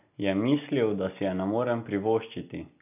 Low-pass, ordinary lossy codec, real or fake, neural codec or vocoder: 3.6 kHz; none; real; none